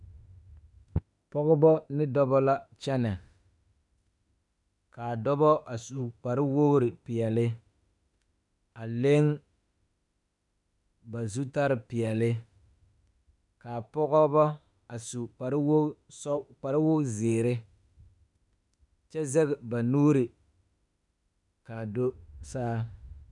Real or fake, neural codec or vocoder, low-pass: fake; autoencoder, 48 kHz, 32 numbers a frame, DAC-VAE, trained on Japanese speech; 10.8 kHz